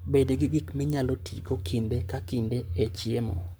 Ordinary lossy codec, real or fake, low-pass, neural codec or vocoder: none; fake; none; codec, 44.1 kHz, 7.8 kbps, Pupu-Codec